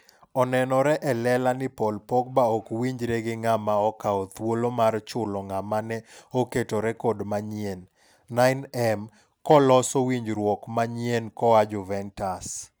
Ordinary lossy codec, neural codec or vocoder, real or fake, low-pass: none; none; real; none